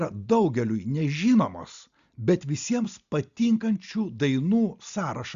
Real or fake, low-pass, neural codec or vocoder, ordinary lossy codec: real; 7.2 kHz; none; Opus, 64 kbps